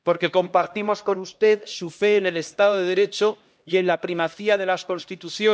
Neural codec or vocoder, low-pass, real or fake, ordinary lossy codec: codec, 16 kHz, 1 kbps, X-Codec, HuBERT features, trained on LibriSpeech; none; fake; none